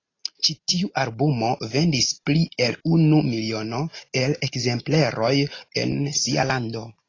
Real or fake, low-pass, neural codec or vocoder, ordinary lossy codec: real; 7.2 kHz; none; AAC, 32 kbps